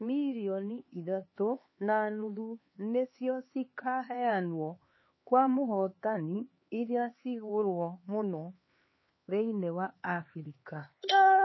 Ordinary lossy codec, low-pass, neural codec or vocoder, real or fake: MP3, 24 kbps; 7.2 kHz; codec, 16 kHz, 4 kbps, X-Codec, HuBERT features, trained on LibriSpeech; fake